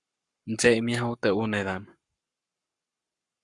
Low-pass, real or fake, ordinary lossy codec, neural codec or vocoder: 10.8 kHz; fake; Opus, 64 kbps; codec, 44.1 kHz, 7.8 kbps, Pupu-Codec